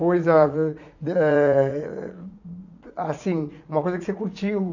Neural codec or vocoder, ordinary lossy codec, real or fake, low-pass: vocoder, 44.1 kHz, 80 mel bands, Vocos; none; fake; 7.2 kHz